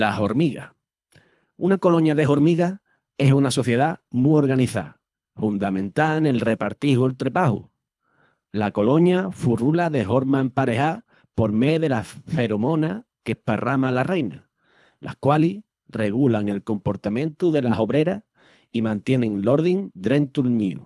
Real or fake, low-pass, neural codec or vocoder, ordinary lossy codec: fake; none; codec, 24 kHz, 3 kbps, HILCodec; none